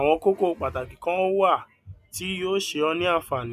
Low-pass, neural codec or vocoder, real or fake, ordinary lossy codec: 14.4 kHz; none; real; none